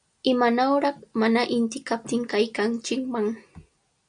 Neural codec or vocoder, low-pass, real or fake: none; 9.9 kHz; real